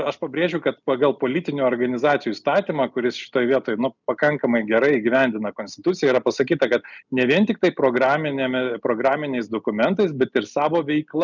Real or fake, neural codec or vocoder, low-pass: real; none; 7.2 kHz